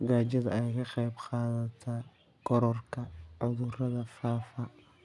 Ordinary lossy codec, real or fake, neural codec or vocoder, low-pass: none; real; none; none